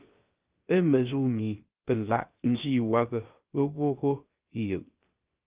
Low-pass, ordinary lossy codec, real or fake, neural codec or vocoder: 3.6 kHz; Opus, 32 kbps; fake; codec, 16 kHz, about 1 kbps, DyCAST, with the encoder's durations